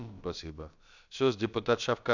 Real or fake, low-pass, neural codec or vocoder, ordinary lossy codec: fake; 7.2 kHz; codec, 16 kHz, about 1 kbps, DyCAST, with the encoder's durations; none